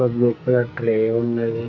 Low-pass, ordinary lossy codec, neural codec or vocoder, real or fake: 7.2 kHz; none; codec, 44.1 kHz, 2.6 kbps, SNAC; fake